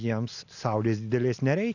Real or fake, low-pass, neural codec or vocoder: real; 7.2 kHz; none